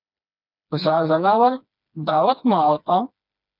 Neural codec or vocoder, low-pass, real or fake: codec, 16 kHz, 2 kbps, FreqCodec, smaller model; 5.4 kHz; fake